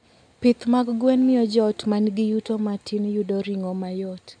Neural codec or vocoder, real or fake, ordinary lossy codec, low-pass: none; real; none; 9.9 kHz